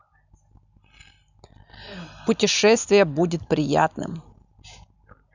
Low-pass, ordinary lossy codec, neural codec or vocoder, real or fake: 7.2 kHz; none; none; real